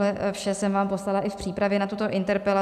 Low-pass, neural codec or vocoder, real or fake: 14.4 kHz; none; real